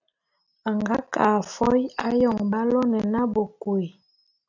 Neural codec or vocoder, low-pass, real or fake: none; 7.2 kHz; real